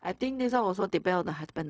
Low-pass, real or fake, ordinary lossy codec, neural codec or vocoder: none; fake; none; codec, 16 kHz, 0.4 kbps, LongCat-Audio-Codec